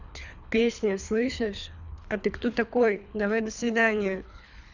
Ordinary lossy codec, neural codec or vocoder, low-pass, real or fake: none; codec, 24 kHz, 3 kbps, HILCodec; 7.2 kHz; fake